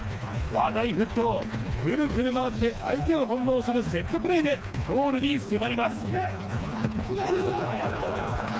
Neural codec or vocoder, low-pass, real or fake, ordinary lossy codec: codec, 16 kHz, 2 kbps, FreqCodec, smaller model; none; fake; none